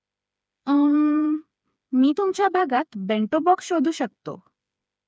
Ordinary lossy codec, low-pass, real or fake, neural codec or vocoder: none; none; fake; codec, 16 kHz, 4 kbps, FreqCodec, smaller model